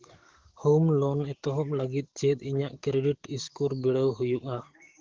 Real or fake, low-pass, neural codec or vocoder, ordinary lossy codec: fake; 7.2 kHz; codec, 44.1 kHz, 7.8 kbps, DAC; Opus, 16 kbps